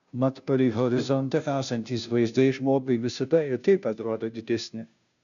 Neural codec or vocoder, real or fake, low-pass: codec, 16 kHz, 0.5 kbps, FunCodec, trained on Chinese and English, 25 frames a second; fake; 7.2 kHz